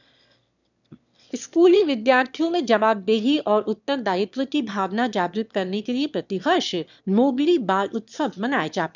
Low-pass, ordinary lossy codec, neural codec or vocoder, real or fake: 7.2 kHz; none; autoencoder, 22.05 kHz, a latent of 192 numbers a frame, VITS, trained on one speaker; fake